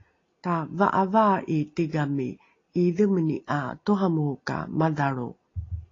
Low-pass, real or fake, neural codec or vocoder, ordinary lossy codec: 7.2 kHz; real; none; AAC, 32 kbps